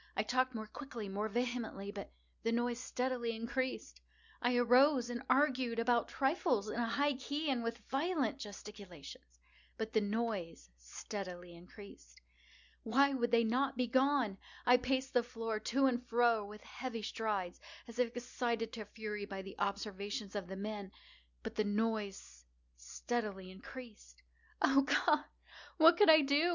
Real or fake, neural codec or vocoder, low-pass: real; none; 7.2 kHz